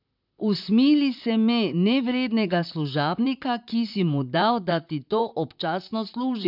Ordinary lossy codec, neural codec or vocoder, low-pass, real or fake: none; vocoder, 44.1 kHz, 128 mel bands, Pupu-Vocoder; 5.4 kHz; fake